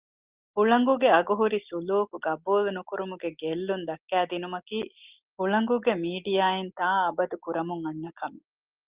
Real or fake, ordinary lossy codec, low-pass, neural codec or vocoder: real; Opus, 32 kbps; 3.6 kHz; none